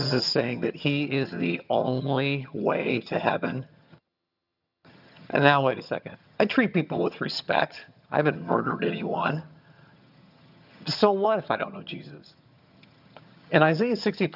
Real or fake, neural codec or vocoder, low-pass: fake; vocoder, 22.05 kHz, 80 mel bands, HiFi-GAN; 5.4 kHz